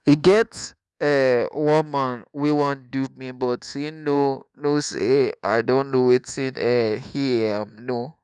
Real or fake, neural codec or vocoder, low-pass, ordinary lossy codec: fake; codec, 24 kHz, 1.2 kbps, DualCodec; none; none